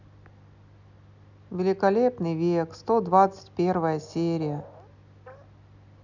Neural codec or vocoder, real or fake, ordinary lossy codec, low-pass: none; real; none; 7.2 kHz